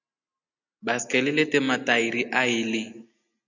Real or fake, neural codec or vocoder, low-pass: real; none; 7.2 kHz